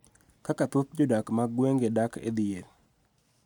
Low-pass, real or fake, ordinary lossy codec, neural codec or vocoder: 19.8 kHz; real; none; none